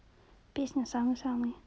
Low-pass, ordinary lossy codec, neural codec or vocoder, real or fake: none; none; none; real